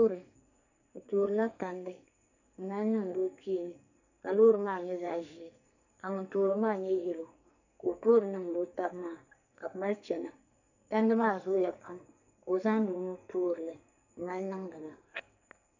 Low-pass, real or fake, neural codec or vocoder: 7.2 kHz; fake; codec, 44.1 kHz, 2.6 kbps, SNAC